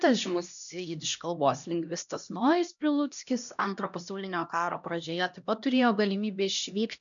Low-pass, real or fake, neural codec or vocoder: 7.2 kHz; fake; codec, 16 kHz, 1 kbps, X-Codec, HuBERT features, trained on LibriSpeech